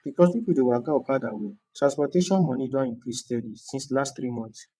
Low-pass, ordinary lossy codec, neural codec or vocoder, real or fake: none; none; vocoder, 22.05 kHz, 80 mel bands, WaveNeXt; fake